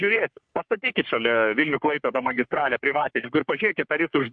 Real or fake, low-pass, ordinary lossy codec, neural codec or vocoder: fake; 9.9 kHz; MP3, 64 kbps; codec, 44.1 kHz, 3.4 kbps, Pupu-Codec